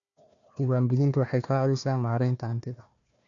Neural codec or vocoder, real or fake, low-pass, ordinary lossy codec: codec, 16 kHz, 1 kbps, FunCodec, trained on Chinese and English, 50 frames a second; fake; 7.2 kHz; none